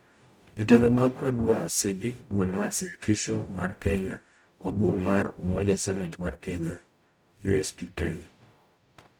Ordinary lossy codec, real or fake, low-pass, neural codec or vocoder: none; fake; none; codec, 44.1 kHz, 0.9 kbps, DAC